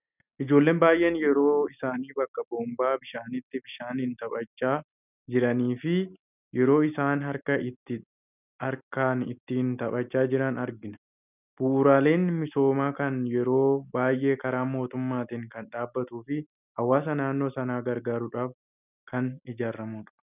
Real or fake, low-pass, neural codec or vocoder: real; 3.6 kHz; none